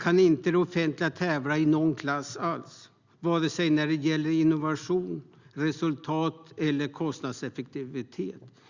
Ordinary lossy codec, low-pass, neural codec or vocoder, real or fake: Opus, 64 kbps; 7.2 kHz; none; real